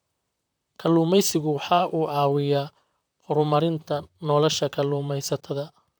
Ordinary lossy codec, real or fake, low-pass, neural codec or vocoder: none; fake; none; vocoder, 44.1 kHz, 128 mel bands, Pupu-Vocoder